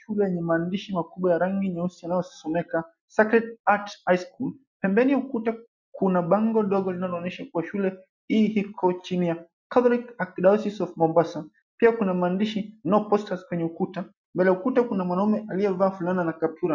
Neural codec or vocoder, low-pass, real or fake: none; 7.2 kHz; real